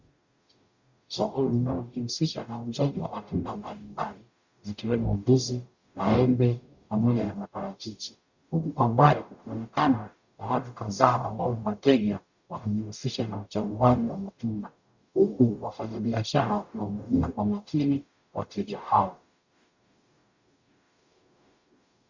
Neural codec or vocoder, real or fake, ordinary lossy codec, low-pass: codec, 44.1 kHz, 0.9 kbps, DAC; fake; Opus, 64 kbps; 7.2 kHz